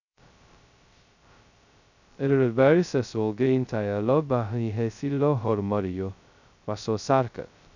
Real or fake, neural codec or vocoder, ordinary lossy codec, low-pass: fake; codec, 16 kHz, 0.2 kbps, FocalCodec; none; 7.2 kHz